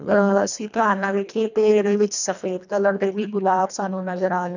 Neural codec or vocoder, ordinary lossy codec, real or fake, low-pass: codec, 24 kHz, 1.5 kbps, HILCodec; none; fake; 7.2 kHz